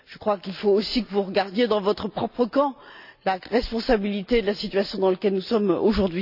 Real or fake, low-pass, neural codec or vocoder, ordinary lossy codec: real; 5.4 kHz; none; none